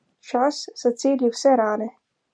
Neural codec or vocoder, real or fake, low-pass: none; real; 9.9 kHz